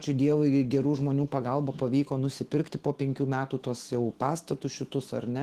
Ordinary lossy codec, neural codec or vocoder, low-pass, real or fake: Opus, 16 kbps; autoencoder, 48 kHz, 128 numbers a frame, DAC-VAE, trained on Japanese speech; 14.4 kHz; fake